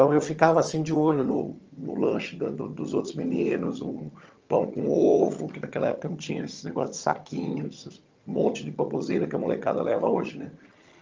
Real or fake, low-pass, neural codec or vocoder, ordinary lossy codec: fake; 7.2 kHz; vocoder, 22.05 kHz, 80 mel bands, HiFi-GAN; Opus, 24 kbps